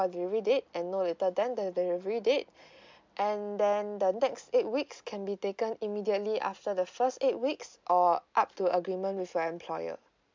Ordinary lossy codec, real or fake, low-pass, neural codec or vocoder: MP3, 64 kbps; real; 7.2 kHz; none